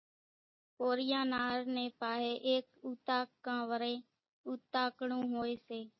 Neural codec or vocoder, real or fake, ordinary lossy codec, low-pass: none; real; MP3, 24 kbps; 7.2 kHz